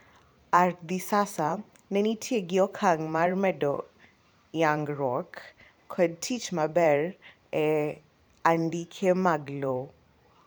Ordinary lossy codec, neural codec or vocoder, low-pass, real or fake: none; vocoder, 44.1 kHz, 128 mel bands every 512 samples, BigVGAN v2; none; fake